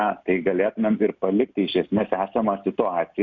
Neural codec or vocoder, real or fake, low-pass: none; real; 7.2 kHz